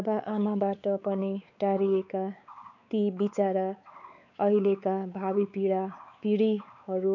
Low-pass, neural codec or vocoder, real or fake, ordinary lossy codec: 7.2 kHz; codec, 16 kHz, 4 kbps, X-Codec, WavLM features, trained on Multilingual LibriSpeech; fake; none